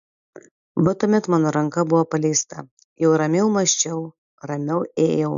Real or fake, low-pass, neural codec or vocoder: real; 7.2 kHz; none